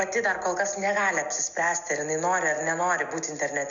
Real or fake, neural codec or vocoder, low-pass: real; none; 7.2 kHz